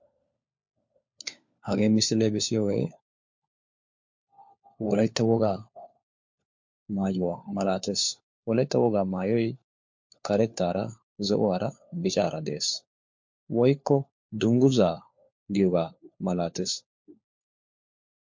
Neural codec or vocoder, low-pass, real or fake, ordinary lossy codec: codec, 16 kHz, 4 kbps, FunCodec, trained on LibriTTS, 50 frames a second; 7.2 kHz; fake; MP3, 48 kbps